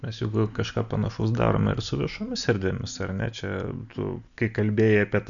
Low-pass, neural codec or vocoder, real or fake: 7.2 kHz; none; real